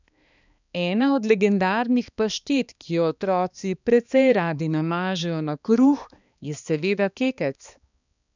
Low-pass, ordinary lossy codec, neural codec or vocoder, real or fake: 7.2 kHz; none; codec, 16 kHz, 2 kbps, X-Codec, HuBERT features, trained on balanced general audio; fake